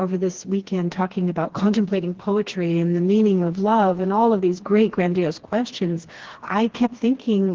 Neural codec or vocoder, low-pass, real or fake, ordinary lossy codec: codec, 16 kHz, 2 kbps, FreqCodec, smaller model; 7.2 kHz; fake; Opus, 16 kbps